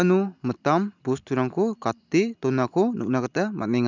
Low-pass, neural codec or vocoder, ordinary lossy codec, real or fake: 7.2 kHz; none; none; real